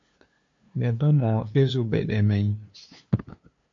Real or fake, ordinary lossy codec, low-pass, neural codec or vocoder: fake; MP3, 48 kbps; 7.2 kHz; codec, 16 kHz, 2 kbps, FunCodec, trained on LibriTTS, 25 frames a second